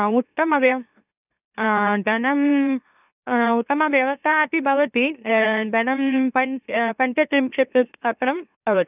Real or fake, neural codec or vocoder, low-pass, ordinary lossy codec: fake; autoencoder, 44.1 kHz, a latent of 192 numbers a frame, MeloTTS; 3.6 kHz; none